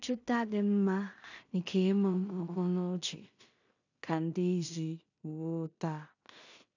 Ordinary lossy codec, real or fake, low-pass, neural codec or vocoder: none; fake; 7.2 kHz; codec, 16 kHz in and 24 kHz out, 0.4 kbps, LongCat-Audio-Codec, two codebook decoder